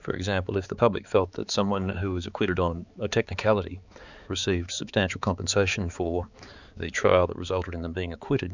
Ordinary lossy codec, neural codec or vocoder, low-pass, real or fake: Opus, 64 kbps; codec, 16 kHz, 4 kbps, X-Codec, HuBERT features, trained on balanced general audio; 7.2 kHz; fake